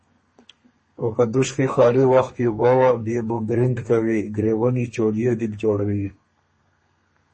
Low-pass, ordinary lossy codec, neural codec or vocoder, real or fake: 10.8 kHz; MP3, 32 kbps; codec, 32 kHz, 1.9 kbps, SNAC; fake